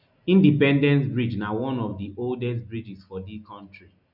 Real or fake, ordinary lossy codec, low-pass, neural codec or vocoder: real; none; 5.4 kHz; none